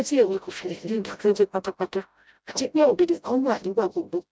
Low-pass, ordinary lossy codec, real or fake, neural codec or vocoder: none; none; fake; codec, 16 kHz, 0.5 kbps, FreqCodec, smaller model